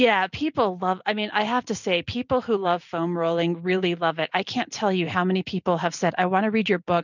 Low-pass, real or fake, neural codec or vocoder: 7.2 kHz; real; none